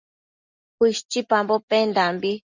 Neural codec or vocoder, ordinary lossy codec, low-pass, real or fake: none; Opus, 64 kbps; 7.2 kHz; real